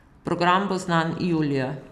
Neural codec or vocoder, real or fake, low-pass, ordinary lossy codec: none; real; 14.4 kHz; none